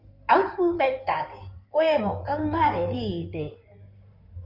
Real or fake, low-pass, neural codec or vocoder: fake; 5.4 kHz; codec, 16 kHz in and 24 kHz out, 2.2 kbps, FireRedTTS-2 codec